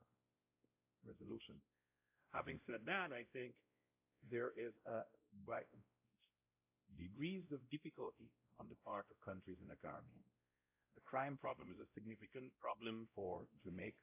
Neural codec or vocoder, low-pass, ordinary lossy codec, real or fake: codec, 16 kHz, 0.5 kbps, X-Codec, WavLM features, trained on Multilingual LibriSpeech; 3.6 kHz; AAC, 24 kbps; fake